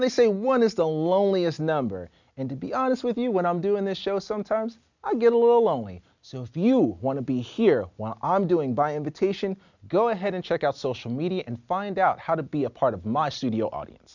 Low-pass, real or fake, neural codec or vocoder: 7.2 kHz; real; none